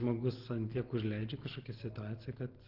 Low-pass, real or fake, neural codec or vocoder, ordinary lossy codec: 5.4 kHz; real; none; Opus, 16 kbps